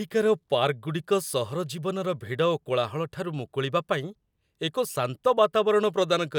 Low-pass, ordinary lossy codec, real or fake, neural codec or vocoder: 19.8 kHz; none; real; none